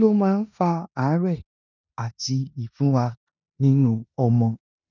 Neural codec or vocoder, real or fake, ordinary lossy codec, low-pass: codec, 16 kHz in and 24 kHz out, 0.9 kbps, LongCat-Audio-Codec, four codebook decoder; fake; none; 7.2 kHz